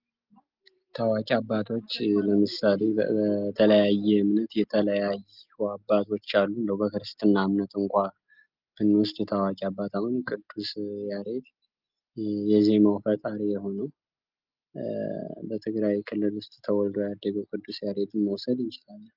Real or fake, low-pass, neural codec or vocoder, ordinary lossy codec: real; 5.4 kHz; none; Opus, 32 kbps